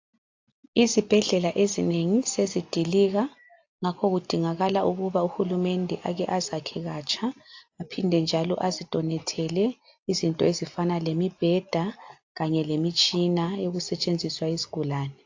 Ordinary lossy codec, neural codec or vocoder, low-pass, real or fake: AAC, 48 kbps; none; 7.2 kHz; real